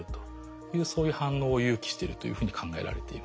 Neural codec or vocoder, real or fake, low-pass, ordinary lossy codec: none; real; none; none